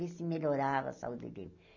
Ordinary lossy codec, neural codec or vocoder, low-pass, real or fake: none; none; 7.2 kHz; real